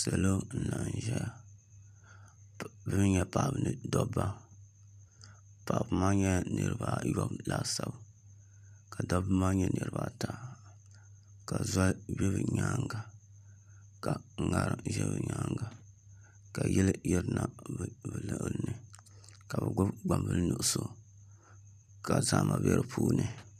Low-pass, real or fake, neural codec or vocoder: 14.4 kHz; fake; vocoder, 44.1 kHz, 128 mel bands every 256 samples, BigVGAN v2